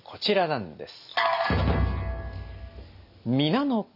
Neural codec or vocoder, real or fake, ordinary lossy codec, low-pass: none; real; AAC, 48 kbps; 5.4 kHz